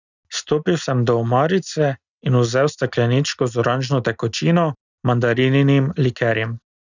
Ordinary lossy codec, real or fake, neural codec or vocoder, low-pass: none; real; none; 7.2 kHz